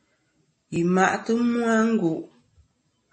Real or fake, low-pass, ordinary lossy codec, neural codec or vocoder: real; 10.8 kHz; MP3, 32 kbps; none